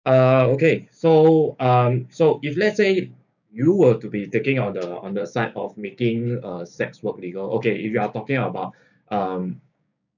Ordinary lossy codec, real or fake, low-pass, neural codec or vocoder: none; fake; 7.2 kHz; codec, 16 kHz, 6 kbps, DAC